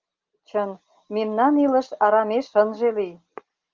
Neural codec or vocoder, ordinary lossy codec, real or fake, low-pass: none; Opus, 32 kbps; real; 7.2 kHz